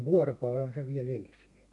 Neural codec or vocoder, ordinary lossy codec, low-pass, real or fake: codec, 32 kHz, 1.9 kbps, SNAC; none; 10.8 kHz; fake